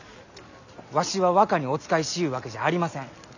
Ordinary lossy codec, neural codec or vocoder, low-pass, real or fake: none; none; 7.2 kHz; real